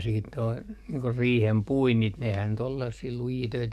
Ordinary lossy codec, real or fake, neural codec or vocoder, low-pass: none; fake; codec, 44.1 kHz, 7.8 kbps, DAC; 14.4 kHz